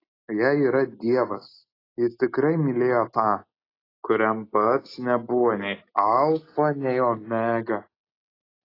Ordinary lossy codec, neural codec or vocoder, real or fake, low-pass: AAC, 24 kbps; none; real; 5.4 kHz